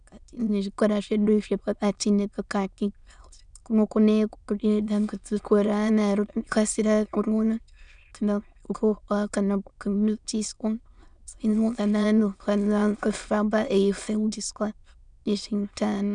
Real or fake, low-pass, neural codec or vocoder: fake; 9.9 kHz; autoencoder, 22.05 kHz, a latent of 192 numbers a frame, VITS, trained on many speakers